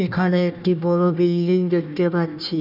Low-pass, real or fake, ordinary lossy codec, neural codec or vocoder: 5.4 kHz; fake; none; codec, 16 kHz, 1 kbps, FunCodec, trained on Chinese and English, 50 frames a second